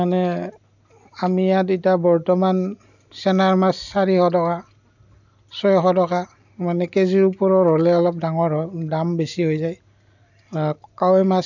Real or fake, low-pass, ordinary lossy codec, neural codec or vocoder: real; 7.2 kHz; none; none